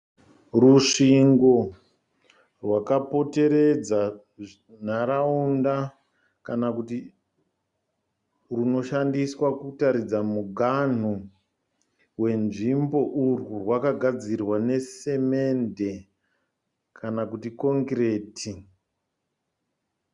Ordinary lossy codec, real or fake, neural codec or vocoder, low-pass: Opus, 64 kbps; real; none; 10.8 kHz